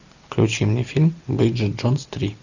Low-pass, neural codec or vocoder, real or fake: 7.2 kHz; none; real